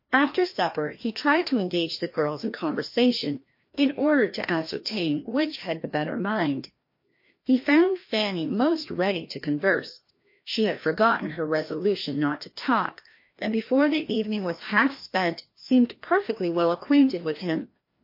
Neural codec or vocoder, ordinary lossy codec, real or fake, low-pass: codec, 16 kHz, 1 kbps, FreqCodec, larger model; MP3, 32 kbps; fake; 5.4 kHz